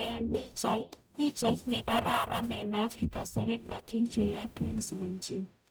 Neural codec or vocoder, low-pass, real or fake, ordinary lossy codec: codec, 44.1 kHz, 0.9 kbps, DAC; none; fake; none